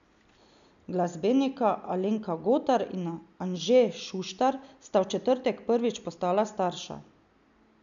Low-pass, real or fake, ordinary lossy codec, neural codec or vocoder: 7.2 kHz; real; MP3, 96 kbps; none